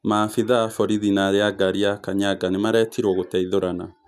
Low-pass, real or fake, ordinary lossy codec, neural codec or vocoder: 14.4 kHz; real; none; none